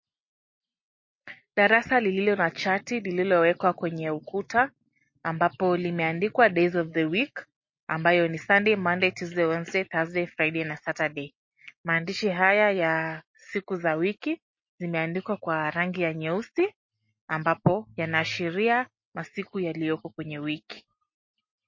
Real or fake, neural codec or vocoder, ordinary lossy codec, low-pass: real; none; MP3, 32 kbps; 7.2 kHz